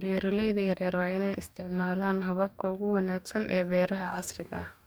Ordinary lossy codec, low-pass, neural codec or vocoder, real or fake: none; none; codec, 44.1 kHz, 2.6 kbps, DAC; fake